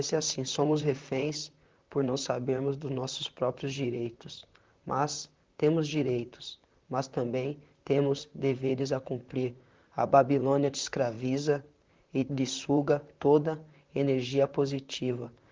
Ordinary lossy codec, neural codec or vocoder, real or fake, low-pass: Opus, 32 kbps; vocoder, 44.1 kHz, 128 mel bands, Pupu-Vocoder; fake; 7.2 kHz